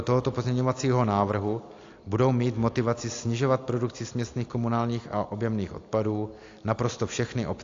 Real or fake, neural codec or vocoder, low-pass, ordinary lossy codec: real; none; 7.2 kHz; AAC, 48 kbps